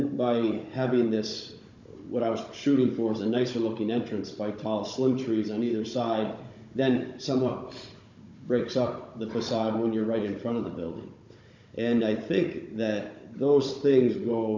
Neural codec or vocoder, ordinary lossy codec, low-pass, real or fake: codec, 16 kHz, 16 kbps, FunCodec, trained on Chinese and English, 50 frames a second; MP3, 64 kbps; 7.2 kHz; fake